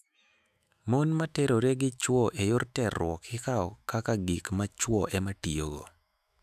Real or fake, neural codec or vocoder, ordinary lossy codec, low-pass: fake; autoencoder, 48 kHz, 128 numbers a frame, DAC-VAE, trained on Japanese speech; none; 14.4 kHz